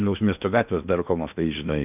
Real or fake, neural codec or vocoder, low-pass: fake; codec, 16 kHz in and 24 kHz out, 0.8 kbps, FocalCodec, streaming, 65536 codes; 3.6 kHz